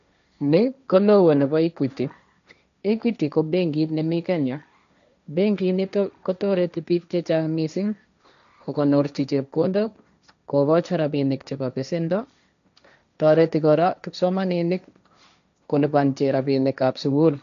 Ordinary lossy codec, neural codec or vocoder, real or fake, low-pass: none; codec, 16 kHz, 1.1 kbps, Voila-Tokenizer; fake; 7.2 kHz